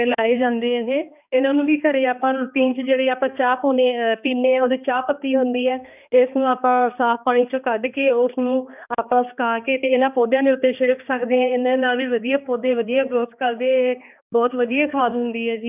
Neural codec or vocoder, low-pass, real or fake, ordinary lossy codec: codec, 16 kHz, 2 kbps, X-Codec, HuBERT features, trained on balanced general audio; 3.6 kHz; fake; none